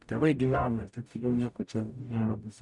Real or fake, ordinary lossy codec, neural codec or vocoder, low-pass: fake; MP3, 96 kbps; codec, 44.1 kHz, 0.9 kbps, DAC; 10.8 kHz